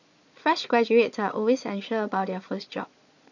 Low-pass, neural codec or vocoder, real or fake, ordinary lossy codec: 7.2 kHz; vocoder, 22.05 kHz, 80 mel bands, Vocos; fake; none